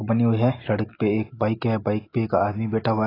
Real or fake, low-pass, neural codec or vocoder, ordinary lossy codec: real; 5.4 kHz; none; AAC, 24 kbps